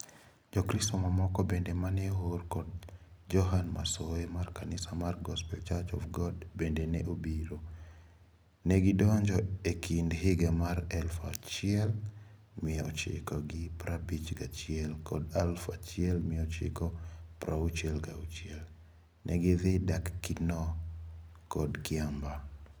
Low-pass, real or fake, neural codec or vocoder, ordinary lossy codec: none; real; none; none